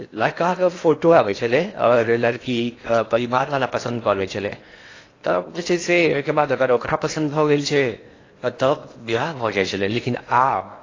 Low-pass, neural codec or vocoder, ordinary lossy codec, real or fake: 7.2 kHz; codec, 16 kHz in and 24 kHz out, 0.8 kbps, FocalCodec, streaming, 65536 codes; AAC, 32 kbps; fake